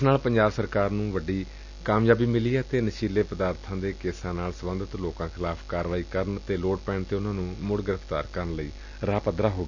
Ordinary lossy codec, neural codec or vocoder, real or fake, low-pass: none; none; real; 7.2 kHz